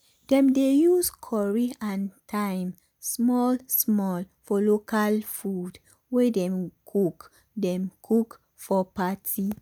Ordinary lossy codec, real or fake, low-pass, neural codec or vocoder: none; real; none; none